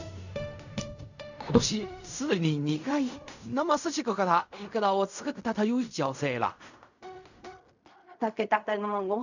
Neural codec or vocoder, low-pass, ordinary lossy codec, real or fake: codec, 16 kHz in and 24 kHz out, 0.4 kbps, LongCat-Audio-Codec, fine tuned four codebook decoder; 7.2 kHz; none; fake